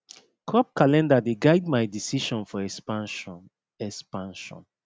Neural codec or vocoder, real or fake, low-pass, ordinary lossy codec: none; real; none; none